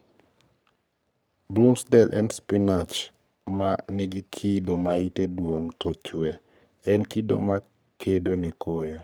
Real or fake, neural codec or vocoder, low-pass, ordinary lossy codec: fake; codec, 44.1 kHz, 3.4 kbps, Pupu-Codec; none; none